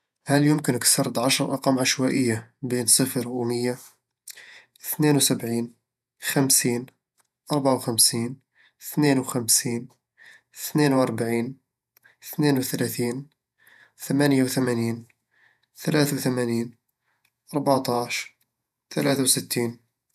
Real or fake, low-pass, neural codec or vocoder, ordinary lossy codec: fake; 14.4 kHz; vocoder, 48 kHz, 128 mel bands, Vocos; none